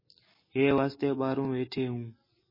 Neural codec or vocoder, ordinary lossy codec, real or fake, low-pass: none; MP3, 24 kbps; real; 5.4 kHz